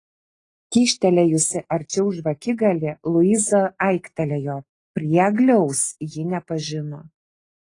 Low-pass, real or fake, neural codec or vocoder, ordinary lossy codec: 10.8 kHz; real; none; AAC, 32 kbps